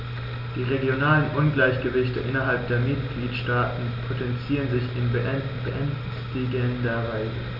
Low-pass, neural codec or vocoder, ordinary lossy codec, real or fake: 5.4 kHz; none; none; real